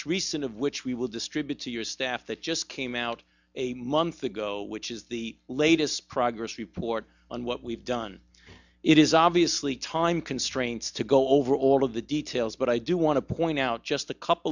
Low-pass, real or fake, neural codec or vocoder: 7.2 kHz; real; none